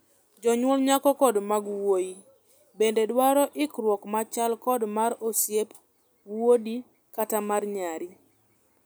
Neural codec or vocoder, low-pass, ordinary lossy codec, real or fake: none; none; none; real